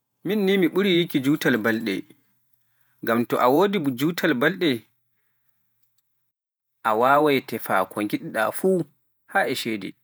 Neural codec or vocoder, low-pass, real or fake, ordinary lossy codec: vocoder, 48 kHz, 128 mel bands, Vocos; none; fake; none